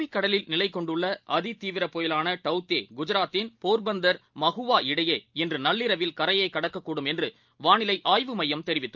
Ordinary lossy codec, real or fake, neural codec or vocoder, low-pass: Opus, 24 kbps; real; none; 7.2 kHz